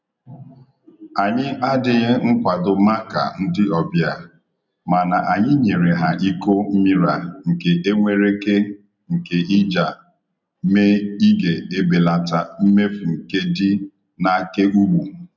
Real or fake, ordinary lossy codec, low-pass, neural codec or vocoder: real; none; 7.2 kHz; none